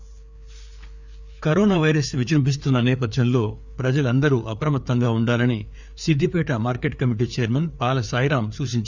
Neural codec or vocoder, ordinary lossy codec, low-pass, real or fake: codec, 16 kHz, 4 kbps, FreqCodec, larger model; none; 7.2 kHz; fake